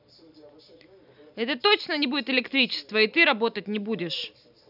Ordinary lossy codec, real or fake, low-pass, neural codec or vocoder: none; real; 5.4 kHz; none